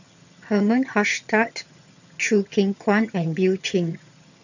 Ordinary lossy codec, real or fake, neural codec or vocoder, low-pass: none; fake; vocoder, 22.05 kHz, 80 mel bands, HiFi-GAN; 7.2 kHz